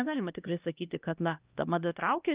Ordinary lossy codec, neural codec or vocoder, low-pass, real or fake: Opus, 24 kbps; codec, 16 kHz, 1 kbps, X-Codec, HuBERT features, trained on LibriSpeech; 3.6 kHz; fake